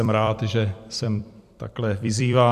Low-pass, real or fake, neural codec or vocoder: 14.4 kHz; fake; vocoder, 44.1 kHz, 128 mel bands every 256 samples, BigVGAN v2